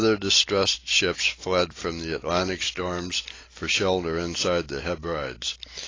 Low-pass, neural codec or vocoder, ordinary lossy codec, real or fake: 7.2 kHz; none; AAC, 32 kbps; real